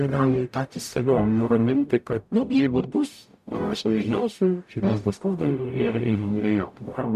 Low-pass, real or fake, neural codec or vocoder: 14.4 kHz; fake; codec, 44.1 kHz, 0.9 kbps, DAC